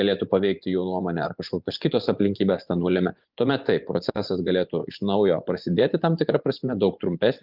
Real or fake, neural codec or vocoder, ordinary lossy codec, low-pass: fake; vocoder, 24 kHz, 100 mel bands, Vocos; Opus, 32 kbps; 5.4 kHz